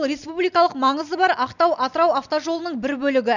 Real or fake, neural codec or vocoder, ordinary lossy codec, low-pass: real; none; none; 7.2 kHz